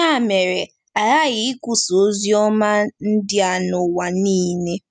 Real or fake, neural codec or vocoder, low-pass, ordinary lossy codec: real; none; 9.9 kHz; none